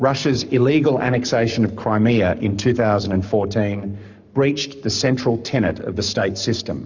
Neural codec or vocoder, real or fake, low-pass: codec, 44.1 kHz, 7.8 kbps, Pupu-Codec; fake; 7.2 kHz